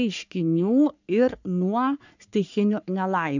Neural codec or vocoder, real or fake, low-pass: codec, 44.1 kHz, 3.4 kbps, Pupu-Codec; fake; 7.2 kHz